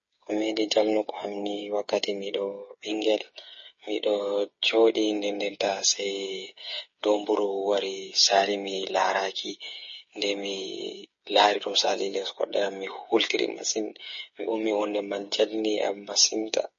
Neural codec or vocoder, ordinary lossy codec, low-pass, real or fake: codec, 16 kHz, 8 kbps, FreqCodec, smaller model; MP3, 32 kbps; 7.2 kHz; fake